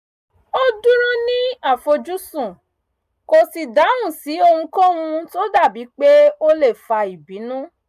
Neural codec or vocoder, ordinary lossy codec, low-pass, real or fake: none; none; 14.4 kHz; real